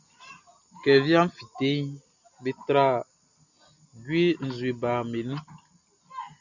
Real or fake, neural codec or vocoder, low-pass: real; none; 7.2 kHz